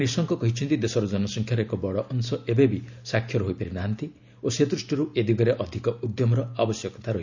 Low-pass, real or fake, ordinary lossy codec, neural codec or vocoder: 7.2 kHz; real; none; none